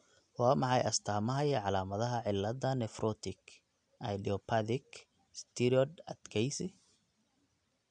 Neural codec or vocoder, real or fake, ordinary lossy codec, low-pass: none; real; none; 9.9 kHz